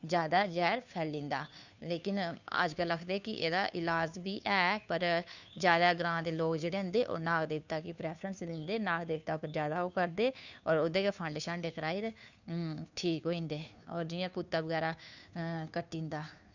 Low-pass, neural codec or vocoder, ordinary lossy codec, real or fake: 7.2 kHz; codec, 16 kHz, 2 kbps, FunCodec, trained on Chinese and English, 25 frames a second; none; fake